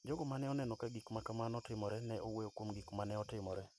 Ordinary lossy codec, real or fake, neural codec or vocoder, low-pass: none; real; none; none